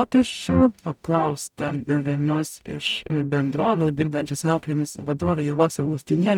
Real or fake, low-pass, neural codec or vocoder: fake; 19.8 kHz; codec, 44.1 kHz, 0.9 kbps, DAC